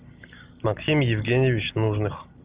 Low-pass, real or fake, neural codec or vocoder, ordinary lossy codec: 3.6 kHz; real; none; Opus, 32 kbps